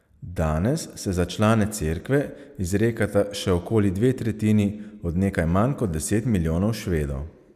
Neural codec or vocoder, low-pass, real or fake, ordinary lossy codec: none; 14.4 kHz; real; none